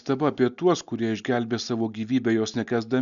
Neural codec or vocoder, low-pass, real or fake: none; 7.2 kHz; real